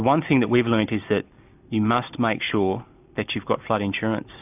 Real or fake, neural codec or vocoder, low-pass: real; none; 3.6 kHz